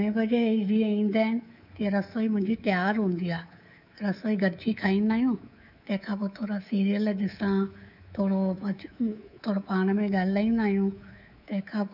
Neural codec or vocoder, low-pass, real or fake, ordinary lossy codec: codec, 24 kHz, 3.1 kbps, DualCodec; 5.4 kHz; fake; none